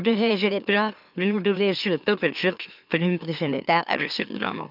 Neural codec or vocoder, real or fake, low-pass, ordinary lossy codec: autoencoder, 44.1 kHz, a latent of 192 numbers a frame, MeloTTS; fake; 5.4 kHz; none